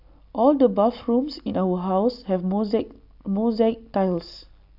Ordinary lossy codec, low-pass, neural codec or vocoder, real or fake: none; 5.4 kHz; none; real